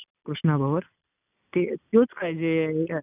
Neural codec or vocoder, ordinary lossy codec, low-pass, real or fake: none; none; 3.6 kHz; real